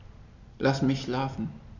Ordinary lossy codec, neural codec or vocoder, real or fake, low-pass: none; none; real; 7.2 kHz